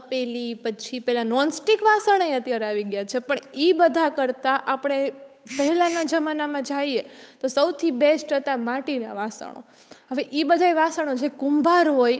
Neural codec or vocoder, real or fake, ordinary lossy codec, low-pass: codec, 16 kHz, 8 kbps, FunCodec, trained on Chinese and English, 25 frames a second; fake; none; none